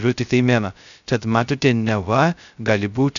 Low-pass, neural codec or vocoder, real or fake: 7.2 kHz; codec, 16 kHz, 0.2 kbps, FocalCodec; fake